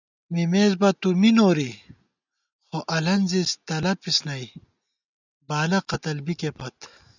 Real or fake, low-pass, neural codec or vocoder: real; 7.2 kHz; none